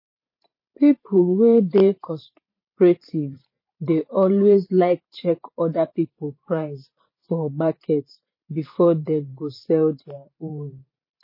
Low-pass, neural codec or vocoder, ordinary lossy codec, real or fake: 5.4 kHz; vocoder, 44.1 kHz, 128 mel bands every 512 samples, BigVGAN v2; MP3, 24 kbps; fake